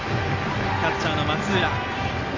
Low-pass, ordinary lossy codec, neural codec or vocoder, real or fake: 7.2 kHz; AAC, 32 kbps; none; real